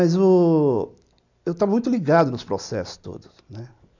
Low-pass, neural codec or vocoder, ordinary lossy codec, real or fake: 7.2 kHz; none; none; real